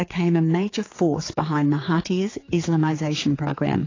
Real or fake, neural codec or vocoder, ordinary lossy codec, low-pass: fake; codec, 16 kHz, 4 kbps, X-Codec, HuBERT features, trained on general audio; AAC, 32 kbps; 7.2 kHz